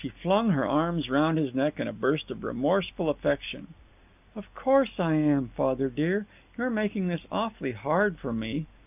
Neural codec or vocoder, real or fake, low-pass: none; real; 3.6 kHz